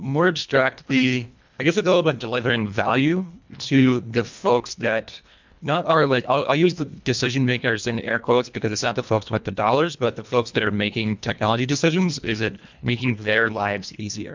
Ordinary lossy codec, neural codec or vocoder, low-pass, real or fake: MP3, 64 kbps; codec, 24 kHz, 1.5 kbps, HILCodec; 7.2 kHz; fake